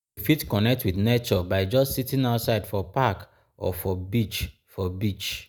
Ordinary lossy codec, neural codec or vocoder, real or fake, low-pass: none; none; real; none